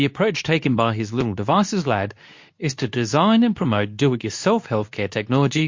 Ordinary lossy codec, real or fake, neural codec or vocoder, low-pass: MP3, 48 kbps; fake; codec, 24 kHz, 0.9 kbps, WavTokenizer, medium speech release version 2; 7.2 kHz